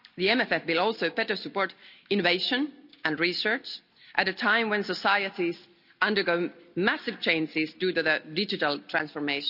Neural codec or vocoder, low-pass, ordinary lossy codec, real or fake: none; 5.4 kHz; none; real